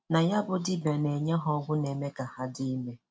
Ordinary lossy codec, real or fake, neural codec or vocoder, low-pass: none; real; none; none